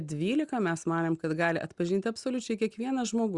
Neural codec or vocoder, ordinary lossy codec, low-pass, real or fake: none; Opus, 64 kbps; 10.8 kHz; real